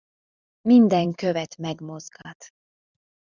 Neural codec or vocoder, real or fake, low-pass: vocoder, 44.1 kHz, 128 mel bands every 256 samples, BigVGAN v2; fake; 7.2 kHz